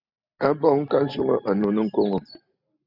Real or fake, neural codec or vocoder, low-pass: real; none; 5.4 kHz